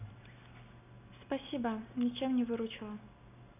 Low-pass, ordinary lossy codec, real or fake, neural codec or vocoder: 3.6 kHz; none; real; none